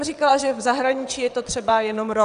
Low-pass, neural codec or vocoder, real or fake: 9.9 kHz; vocoder, 22.05 kHz, 80 mel bands, WaveNeXt; fake